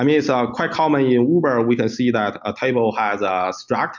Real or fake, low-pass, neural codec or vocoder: real; 7.2 kHz; none